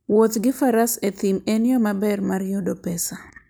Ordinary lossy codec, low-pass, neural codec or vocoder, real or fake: none; none; none; real